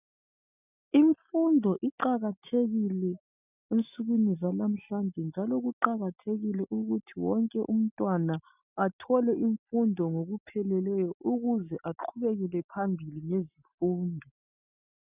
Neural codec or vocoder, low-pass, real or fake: none; 3.6 kHz; real